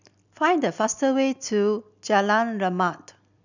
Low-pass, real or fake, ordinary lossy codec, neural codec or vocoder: 7.2 kHz; real; none; none